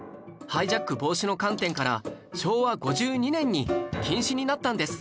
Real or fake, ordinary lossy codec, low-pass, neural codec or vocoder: real; none; none; none